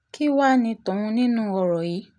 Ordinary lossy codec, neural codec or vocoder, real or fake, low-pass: none; none; real; 9.9 kHz